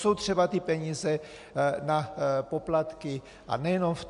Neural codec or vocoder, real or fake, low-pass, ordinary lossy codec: none; real; 10.8 kHz; MP3, 64 kbps